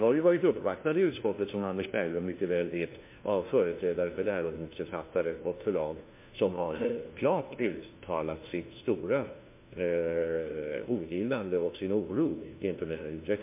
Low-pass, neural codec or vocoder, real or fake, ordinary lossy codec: 3.6 kHz; codec, 16 kHz, 1 kbps, FunCodec, trained on LibriTTS, 50 frames a second; fake; MP3, 24 kbps